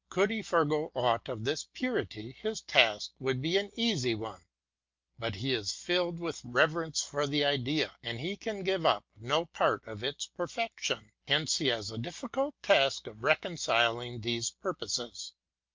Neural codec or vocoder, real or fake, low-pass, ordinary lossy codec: none; real; 7.2 kHz; Opus, 24 kbps